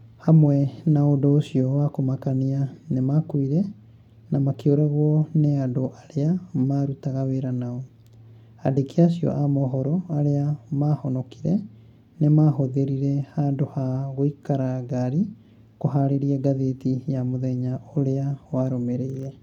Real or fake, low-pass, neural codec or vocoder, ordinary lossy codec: real; 19.8 kHz; none; none